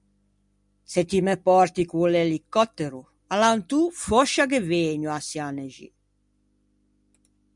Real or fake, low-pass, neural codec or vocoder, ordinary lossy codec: real; 10.8 kHz; none; MP3, 96 kbps